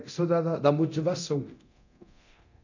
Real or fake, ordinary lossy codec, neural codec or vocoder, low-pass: fake; none; codec, 24 kHz, 0.9 kbps, DualCodec; 7.2 kHz